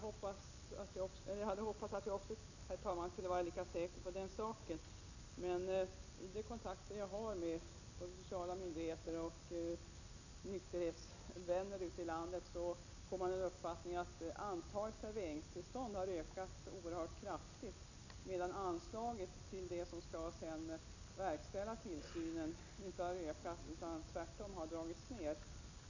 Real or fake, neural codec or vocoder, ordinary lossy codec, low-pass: real; none; none; 7.2 kHz